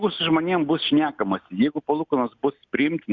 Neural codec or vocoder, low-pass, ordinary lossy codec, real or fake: none; 7.2 kHz; Opus, 64 kbps; real